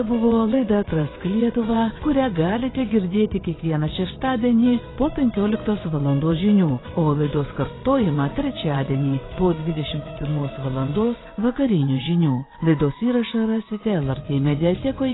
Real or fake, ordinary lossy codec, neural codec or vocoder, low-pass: fake; AAC, 16 kbps; vocoder, 22.05 kHz, 80 mel bands, WaveNeXt; 7.2 kHz